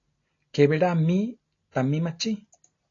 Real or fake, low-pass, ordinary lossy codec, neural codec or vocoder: real; 7.2 kHz; AAC, 32 kbps; none